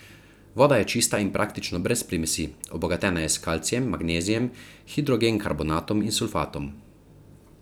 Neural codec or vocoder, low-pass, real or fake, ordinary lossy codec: vocoder, 44.1 kHz, 128 mel bands every 256 samples, BigVGAN v2; none; fake; none